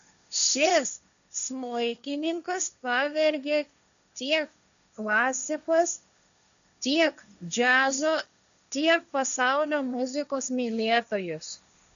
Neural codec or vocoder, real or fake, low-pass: codec, 16 kHz, 1.1 kbps, Voila-Tokenizer; fake; 7.2 kHz